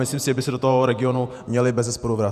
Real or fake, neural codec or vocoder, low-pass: fake; vocoder, 48 kHz, 128 mel bands, Vocos; 14.4 kHz